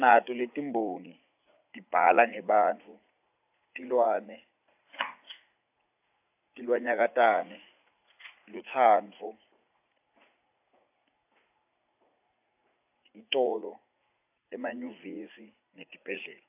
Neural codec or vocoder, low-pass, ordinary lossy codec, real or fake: vocoder, 44.1 kHz, 80 mel bands, Vocos; 3.6 kHz; AAC, 32 kbps; fake